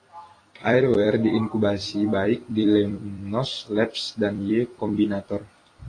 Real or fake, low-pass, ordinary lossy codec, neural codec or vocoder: fake; 9.9 kHz; AAC, 32 kbps; vocoder, 44.1 kHz, 128 mel bands every 256 samples, BigVGAN v2